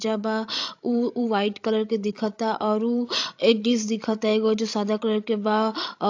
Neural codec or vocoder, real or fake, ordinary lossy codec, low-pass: codec, 16 kHz, 16 kbps, FreqCodec, larger model; fake; AAC, 48 kbps; 7.2 kHz